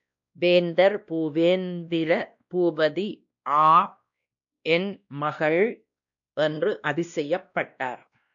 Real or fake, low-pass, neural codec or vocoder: fake; 7.2 kHz; codec, 16 kHz, 1 kbps, X-Codec, WavLM features, trained on Multilingual LibriSpeech